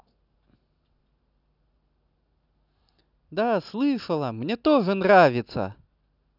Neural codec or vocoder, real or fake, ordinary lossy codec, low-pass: none; real; none; 5.4 kHz